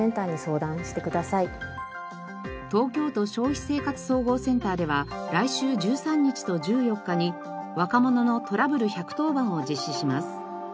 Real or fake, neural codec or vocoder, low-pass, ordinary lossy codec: real; none; none; none